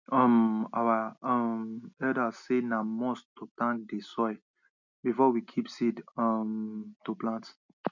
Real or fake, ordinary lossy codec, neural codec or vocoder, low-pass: real; none; none; 7.2 kHz